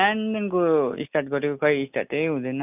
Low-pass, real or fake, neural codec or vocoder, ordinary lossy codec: 3.6 kHz; real; none; none